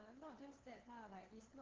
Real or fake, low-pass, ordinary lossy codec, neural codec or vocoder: fake; 7.2 kHz; Opus, 16 kbps; codec, 16 kHz, 2 kbps, FunCodec, trained on LibriTTS, 25 frames a second